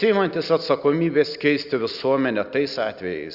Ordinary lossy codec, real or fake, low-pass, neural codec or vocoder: Opus, 64 kbps; real; 5.4 kHz; none